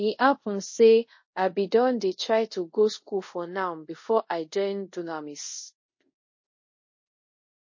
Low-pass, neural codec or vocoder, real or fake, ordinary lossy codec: 7.2 kHz; codec, 24 kHz, 0.5 kbps, DualCodec; fake; MP3, 32 kbps